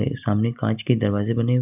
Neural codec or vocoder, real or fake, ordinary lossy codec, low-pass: none; real; none; 3.6 kHz